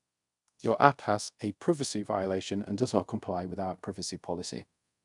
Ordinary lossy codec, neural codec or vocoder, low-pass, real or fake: none; codec, 24 kHz, 0.5 kbps, DualCodec; 10.8 kHz; fake